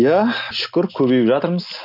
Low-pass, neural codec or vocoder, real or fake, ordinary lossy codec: 5.4 kHz; none; real; none